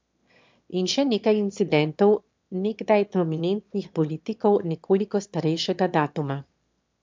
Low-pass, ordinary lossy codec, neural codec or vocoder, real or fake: 7.2 kHz; MP3, 64 kbps; autoencoder, 22.05 kHz, a latent of 192 numbers a frame, VITS, trained on one speaker; fake